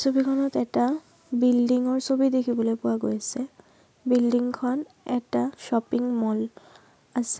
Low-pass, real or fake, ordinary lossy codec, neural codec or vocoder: none; real; none; none